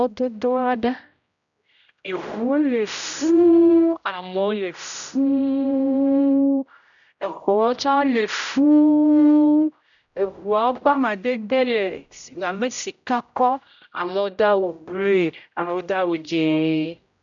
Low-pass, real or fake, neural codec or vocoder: 7.2 kHz; fake; codec, 16 kHz, 0.5 kbps, X-Codec, HuBERT features, trained on general audio